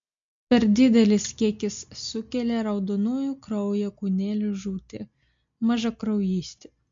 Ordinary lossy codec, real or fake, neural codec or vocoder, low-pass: MP3, 48 kbps; real; none; 7.2 kHz